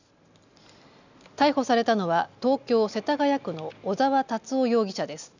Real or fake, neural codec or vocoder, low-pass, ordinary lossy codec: real; none; 7.2 kHz; none